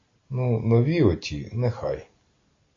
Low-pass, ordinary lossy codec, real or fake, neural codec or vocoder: 7.2 kHz; AAC, 32 kbps; real; none